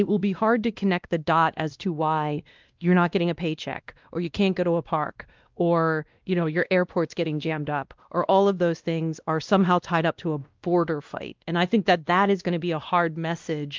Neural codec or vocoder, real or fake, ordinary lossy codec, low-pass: codec, 16 kHz, 1 kbps, X-Codec, WavLM features, trained on Multilingual LibriSpeech; fake; Opus, 24 kbps; 7.2 kHz